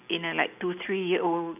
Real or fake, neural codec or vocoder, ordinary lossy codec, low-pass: real; none; none; 3.6 kHz